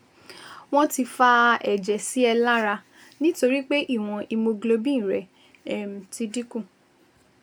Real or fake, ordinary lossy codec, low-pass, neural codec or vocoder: real; none; none; none